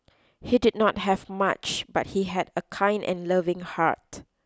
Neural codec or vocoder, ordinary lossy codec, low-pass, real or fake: none; none; none; real